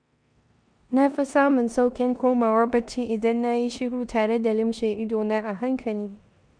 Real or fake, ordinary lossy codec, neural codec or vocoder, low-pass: fake; MP3, 96 kbps; codec, 16 kHz in and 24 kHz out, 0.9 kbps, LongCat-Audio-Codec, four codebook decoder; 9.9 kHz